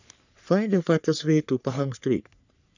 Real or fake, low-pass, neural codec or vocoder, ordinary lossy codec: fake; 7.2 kHz; codec, 44.1 kHz, 3.4 kbps, Pupu-Codec; none